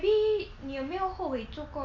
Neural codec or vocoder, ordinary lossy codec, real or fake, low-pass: none; none; real; 7.2 kHz